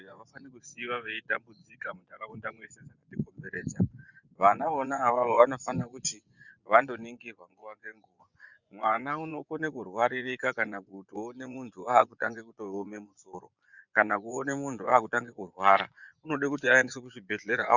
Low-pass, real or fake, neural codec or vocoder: 7.2 kHz; fake; vocoder, 24 kHz, 100 mel bands, Vocos